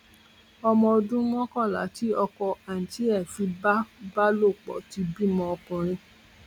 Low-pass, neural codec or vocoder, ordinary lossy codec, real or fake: 19.8 kHz; none; none; real